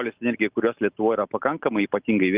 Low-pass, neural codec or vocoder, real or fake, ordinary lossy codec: 3.6 kHz; none; real; Opus, 24 kbps